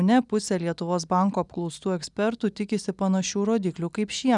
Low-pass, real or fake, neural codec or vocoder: 10.8 kHz; real; none